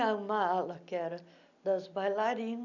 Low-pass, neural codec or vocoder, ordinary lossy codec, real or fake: 7.2 kHz; none; none; real